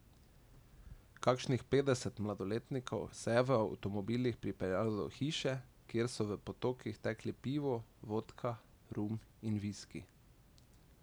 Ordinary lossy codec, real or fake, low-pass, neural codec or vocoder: none; real; none; none